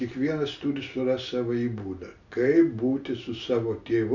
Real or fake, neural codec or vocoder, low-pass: real; none; 7.2 kHz